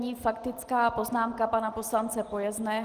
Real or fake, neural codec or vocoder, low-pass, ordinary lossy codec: real; none; 14.4 kHz; Opus, 24 kbps